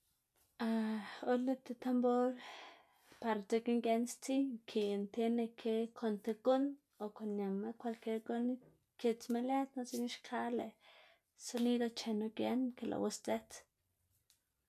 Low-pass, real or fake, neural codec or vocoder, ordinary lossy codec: 14.4 kHz; real; none; none